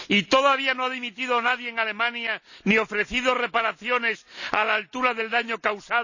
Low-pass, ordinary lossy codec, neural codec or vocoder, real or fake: 7.2 kHz; none; none; real